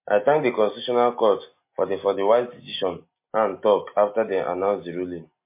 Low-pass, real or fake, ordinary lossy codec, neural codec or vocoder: 3.6 kHz; real; MP3, 24 kbps; none